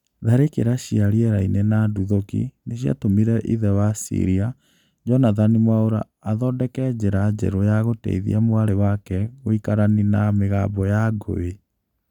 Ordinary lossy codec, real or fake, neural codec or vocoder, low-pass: none; real; none; 19.8 kHz